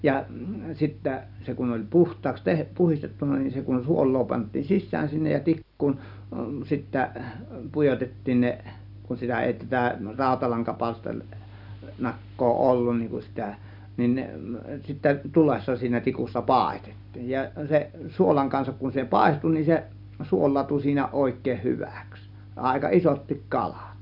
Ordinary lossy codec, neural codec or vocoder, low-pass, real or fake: none; none; 5.4 kHz; real